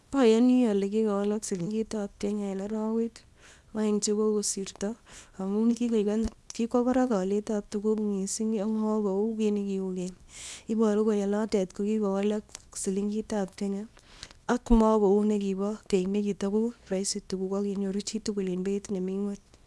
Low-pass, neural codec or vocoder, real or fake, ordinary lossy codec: none; codec, 24 kHz, 0.9 kbps, WavTokenizer, small release; fake; none